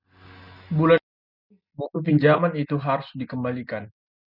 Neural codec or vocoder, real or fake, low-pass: none; real; 5.4 kHz